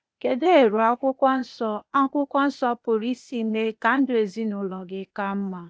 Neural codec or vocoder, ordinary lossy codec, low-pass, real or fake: codec, 16 kHz, 0.8 kbps, ZipCodec; none; none; fake